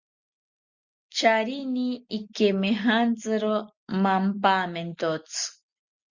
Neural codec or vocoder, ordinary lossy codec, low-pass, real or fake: none; Opus, 64 kbps; 7.2 kHz; real